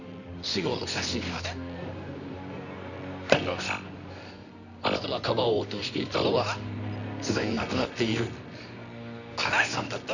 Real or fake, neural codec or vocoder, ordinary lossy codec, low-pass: fake; codec, 24 kHz, 0.9 kbps, WavTokenizer, medium music audio release; none; 7.2 kHz